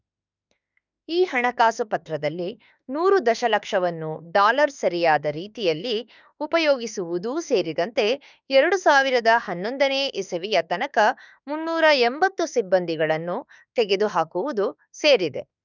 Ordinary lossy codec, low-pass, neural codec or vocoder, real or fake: none; 7.2 kHz; autoencoder, 48 kHz, 32 numbers a frame, DAC-VAE, trained on Japanese speech; fake